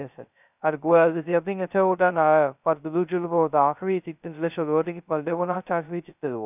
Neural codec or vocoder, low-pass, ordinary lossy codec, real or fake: codec, 16 kHz, 0.2 kbps, FocalCodec; 3.6 kHz; none; fake